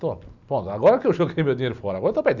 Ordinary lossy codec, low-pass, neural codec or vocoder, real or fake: none; 7.2 kHz; none; real